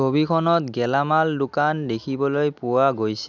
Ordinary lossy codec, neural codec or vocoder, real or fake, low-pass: none; none; real; 7.2 kHz